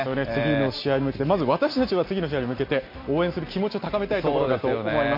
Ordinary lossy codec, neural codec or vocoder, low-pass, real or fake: MP3, 32 kbps; none; 5.4 kHz; real